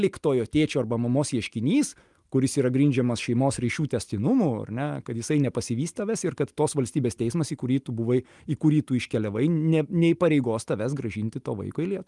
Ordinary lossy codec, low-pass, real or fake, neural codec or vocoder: Opus, 32 kbps; 10.8 kHz; real; none